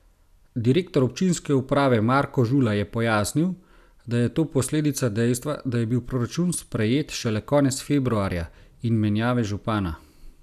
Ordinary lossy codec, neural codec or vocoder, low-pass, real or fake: none; none; 14.4 kHz; real